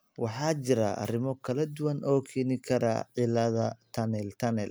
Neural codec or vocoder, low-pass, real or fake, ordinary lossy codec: none; none; real; none